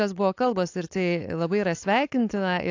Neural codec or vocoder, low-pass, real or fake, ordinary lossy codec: codec, 16 kHz, 4.8 kbps, FACodec; 7.2 kHz; fake; AAC, 48 kbps